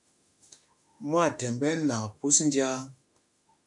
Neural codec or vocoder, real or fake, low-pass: autoencoder, 48 kHz, 32 numbers a frame, DAC-VAE, trained on Japanese speech; fake; 10.8 kHz